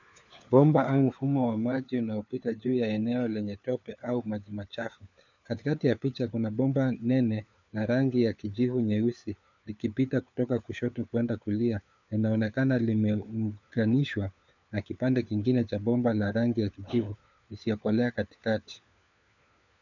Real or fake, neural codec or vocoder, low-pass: fake; codec, 16 kHz, 4 kbps, FunCodec, trained on LibriTTS, 50 frames a second; 7.2 kHz